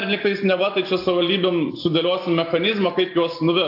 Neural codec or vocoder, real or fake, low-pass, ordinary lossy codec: none; real; 5.4 kHz; AAC, 32 kbps